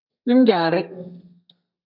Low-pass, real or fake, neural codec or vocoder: 5.4 kHz; fake; codec, 32 kHz, 1.9 kbps, SNAC